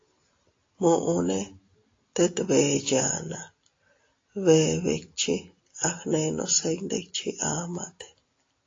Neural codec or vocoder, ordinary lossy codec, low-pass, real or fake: none; AAC, 32 kbps; 7.2 kHz; real